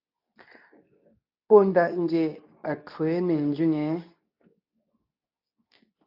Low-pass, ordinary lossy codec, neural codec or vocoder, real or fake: 5.4 kHz; AAC, 48 kbps; codec, 24 kHz, 0.9 kbps, WavTokenizer, medium speech release version 2; fake